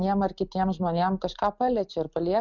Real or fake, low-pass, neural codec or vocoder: real; 7.2 kHz; none